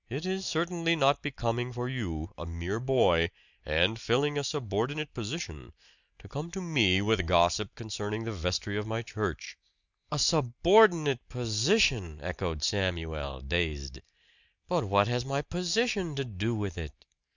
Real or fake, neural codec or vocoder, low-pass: real; none; 7.2 kHz